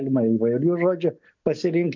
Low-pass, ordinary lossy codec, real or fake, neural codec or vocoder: 7.2 kHz; MP3, 64 kbps; real; none